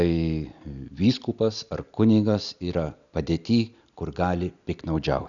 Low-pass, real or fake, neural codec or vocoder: 7.2 kHz; real; none